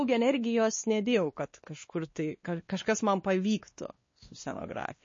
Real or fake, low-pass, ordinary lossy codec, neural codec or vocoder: fake; 7.2 kHz; MP3, 32 kbps; codec, 16 kHz, 2 kbps, X-Codec, WavLM features, trained on Multilingual LibriSpeech